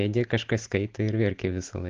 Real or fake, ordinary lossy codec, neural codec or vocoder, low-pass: real; Opus, 16 kbps; none; 7.2 kHz